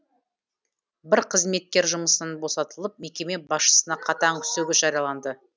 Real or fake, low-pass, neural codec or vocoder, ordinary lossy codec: real; none; none; none